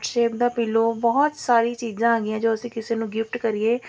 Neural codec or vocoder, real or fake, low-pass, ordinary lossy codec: none; real; none; none